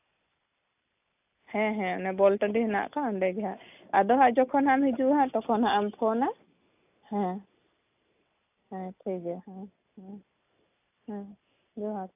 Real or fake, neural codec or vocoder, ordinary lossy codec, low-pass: real; none; AAC, 32 kbps; 3.6 kHz